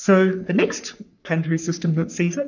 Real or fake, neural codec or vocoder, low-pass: fake; codec, 44.1 kHz, 3.4 kbps, Pupu-Codec; 7.2 kHz